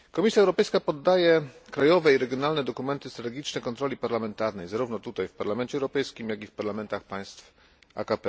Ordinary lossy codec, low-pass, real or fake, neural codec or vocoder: none; none; real; none